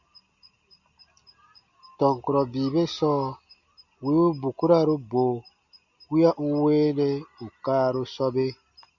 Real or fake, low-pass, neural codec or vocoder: real; 7.2 kHz; none